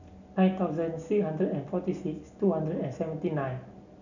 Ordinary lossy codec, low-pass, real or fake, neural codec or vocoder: none; 7.2 kHz; real; none